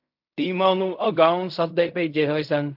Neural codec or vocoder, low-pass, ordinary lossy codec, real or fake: codec, 16 kHz in and 24 kHz out, 0.4 kbps, LongCat-Audio-Codec, fine tuned four codebook decoder; 5.4 kHz; MP3, 48 kbps; fake